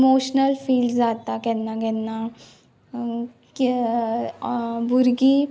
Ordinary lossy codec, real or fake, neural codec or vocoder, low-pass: none; real; none; none